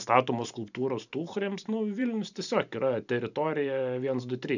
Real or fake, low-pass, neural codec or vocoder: fake; 7.2 kHz; vocoder, 44.1 kHz, 128 mel bands every 512 samples, BigVGAN v2